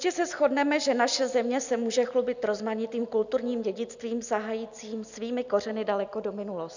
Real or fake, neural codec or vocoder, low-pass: real; none; 7.2 kHz